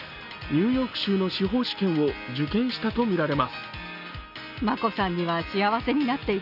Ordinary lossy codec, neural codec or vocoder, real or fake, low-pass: none; none; real; 5.4 kHz